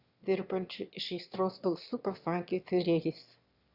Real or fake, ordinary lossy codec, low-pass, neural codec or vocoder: fake; Opus, 64 kbps; 5.4 kHz; autoencoder, 22.05 kHz, a latent of 192 numbers a frame, VITS, trained on one speaker